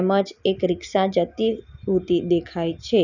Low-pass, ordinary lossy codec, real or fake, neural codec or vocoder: 7.2 kHz; none; real; none